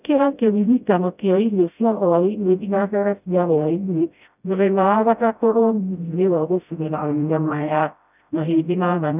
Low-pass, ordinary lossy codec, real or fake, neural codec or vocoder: 3.6 kHz; none; fake; codec, 16 kHz, 0.5 kbps, FreqCodec, smaller model